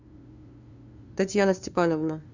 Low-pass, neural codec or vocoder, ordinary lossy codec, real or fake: 7.2 kHz; codec, 16 kHz, 2 kbps, FunCodec, trained on LibriTTS, 25 frames a second; Opus, 64 kbps; fake